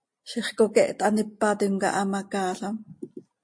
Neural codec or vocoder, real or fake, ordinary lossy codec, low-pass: none; real; MP3, 48 kbps; 9.9 kHz